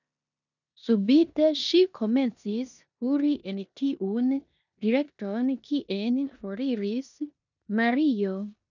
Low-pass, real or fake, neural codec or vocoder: 7.2 kHz; fake; codec, 16 kHz in and 24 kHz out, 0.9 kbps, LongCat-Audio-Codec, four codebook decoder